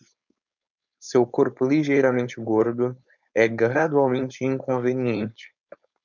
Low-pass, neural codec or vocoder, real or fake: 7.2 kHz; codec, 16 kHz, 4.8 kbps, FACodec; fake